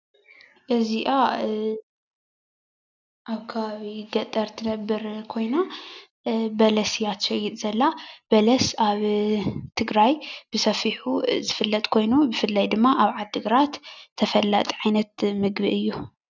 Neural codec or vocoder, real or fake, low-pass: none; real; 7.2 kHz